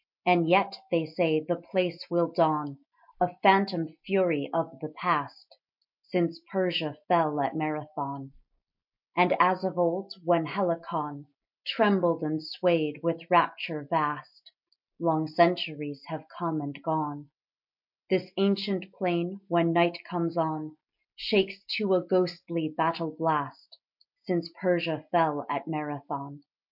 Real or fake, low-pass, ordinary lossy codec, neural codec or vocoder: real; 5.4 kHz; MP3, 48 kbps; none